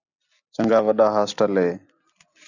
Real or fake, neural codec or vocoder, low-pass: real; none; 7.2 kHz